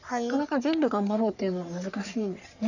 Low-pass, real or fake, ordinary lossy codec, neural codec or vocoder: 7.2 kHz; fake; none; codec, 44.1 kHz, 3.4 kbps, Pupu-Codec